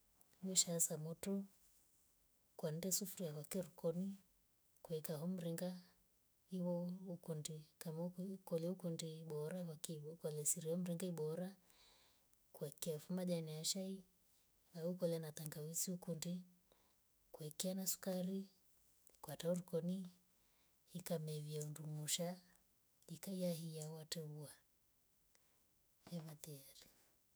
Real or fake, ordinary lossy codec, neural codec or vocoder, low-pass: fake; none; autoencoder, 48 kHz, 128 numbers a frame, DAC-VAE, trained on Japanese speech; none